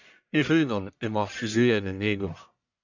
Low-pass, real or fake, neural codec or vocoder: 7.2 kHz; fake; codec, 44.1 kHz, 1.7 kbps, Pupu-Codec